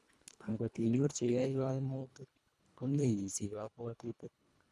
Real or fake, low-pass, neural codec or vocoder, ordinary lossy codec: fake; none; codec, 24 kHz, 1.5 kbps, HILCodec; none